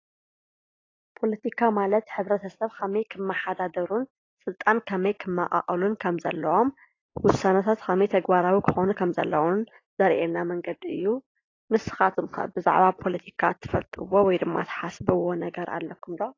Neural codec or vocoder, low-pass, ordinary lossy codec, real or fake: none; 7.2 kHz; AAC, 32 kbps; real